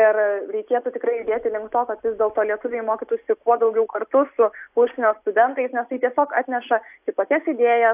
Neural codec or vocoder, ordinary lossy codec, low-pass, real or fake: none; AAC, 32 kbps; 3.6 kHz; real